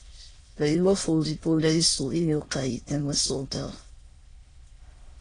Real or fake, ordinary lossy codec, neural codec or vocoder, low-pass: fake; AAC, 32 kbps; autoencoder, 22.05 kHz, a latent of 192 numbers a frame, VITS, trained on many speakers; 9.9 kHz